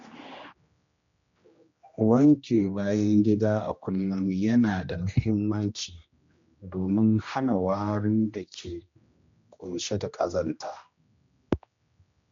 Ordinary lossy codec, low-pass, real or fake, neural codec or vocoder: MP3, 48 kbps; 7.2 kHz; fake; codec, 16 kHz, 1 kbps, X-Codec, HuBERT features, trained on general audio